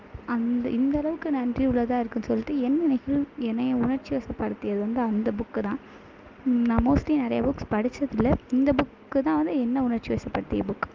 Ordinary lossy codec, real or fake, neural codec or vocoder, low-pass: Opus, 32 kbps; real; none; 7.2 kHz